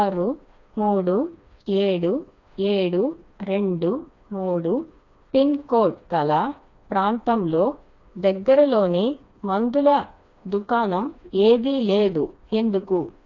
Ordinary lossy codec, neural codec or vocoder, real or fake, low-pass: AAC, 48 kbps; codec, 16 kHz, 2 kbps, FreqCodec, smaller model; fake; 7.2 kHz